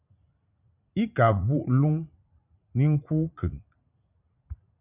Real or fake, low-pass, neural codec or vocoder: fake; 3.6 kHz; vocoder, 44.1 kHz, 80 mel bands, Vocos